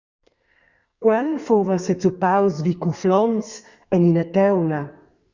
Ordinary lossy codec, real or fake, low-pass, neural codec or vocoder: Opus, 64 kbps; fake; 7.2 kHz; codec, 32 kHz, 1.9 kbps, SNAC